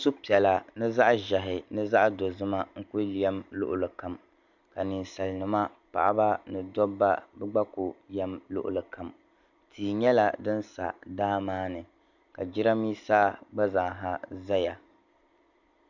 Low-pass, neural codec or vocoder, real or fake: 7.2 kHz; none; real